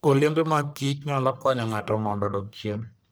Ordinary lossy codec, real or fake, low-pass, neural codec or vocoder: none; fake; none; codec, 44.1 kHz, 1.7 kbps, Pupu-Codec